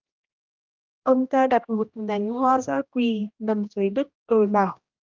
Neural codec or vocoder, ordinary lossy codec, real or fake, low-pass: codec, 16 kHz, 1 kbps, X-Codec, HuBERT features, trained on general audio; Opus, 32 kbps; fake; 7.2 kHz